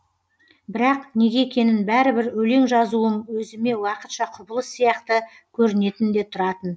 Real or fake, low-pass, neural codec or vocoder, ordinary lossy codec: real; none; none; none